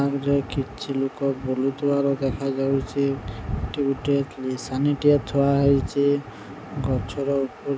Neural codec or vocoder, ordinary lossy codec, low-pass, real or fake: none; none; none; real